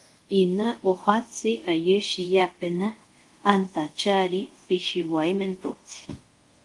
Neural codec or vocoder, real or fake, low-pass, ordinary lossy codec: codec, 24 kHz, 0.5 kbps, DualCodec; fake; 10.8 kHz; Opus, 24 kbps